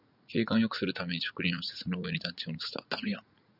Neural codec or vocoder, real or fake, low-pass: vocoder, 44.1 kHz, 80 mel bands, Vocos; fake; 5.4 kHz